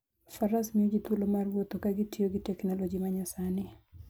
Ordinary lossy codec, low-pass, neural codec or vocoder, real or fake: none; none; none; real